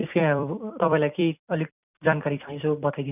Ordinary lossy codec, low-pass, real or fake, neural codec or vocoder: none; 3.6 kHz; fake; vocoder, 44.1 kHz, 128 mel bands every 256 samples, BigVGAN v2